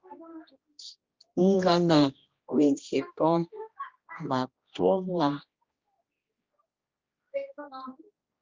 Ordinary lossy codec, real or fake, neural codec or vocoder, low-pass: Opus, 24 kbps; fake; codec, 16 kHz, 1 kbps, X-Codec, HuBERT features, trained on general audio; 7.2 kHz